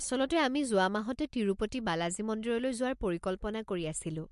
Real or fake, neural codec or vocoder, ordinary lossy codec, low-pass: real; none; MP3, 64 kbps; 10.8 kHz